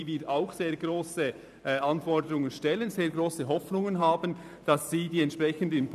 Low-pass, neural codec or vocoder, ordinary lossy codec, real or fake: 14.4 kHz; vocoder, 44.1 kHz, 128 mel bands every 512 samples, BigVGAN v2; none; fake